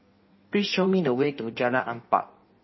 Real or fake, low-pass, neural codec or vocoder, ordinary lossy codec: fake; 7.2 kHz; codec, 16 kHz in and 24 kHz out, 1.1 kbps, FireRedTTS-2 codec; MP3, 24 kbps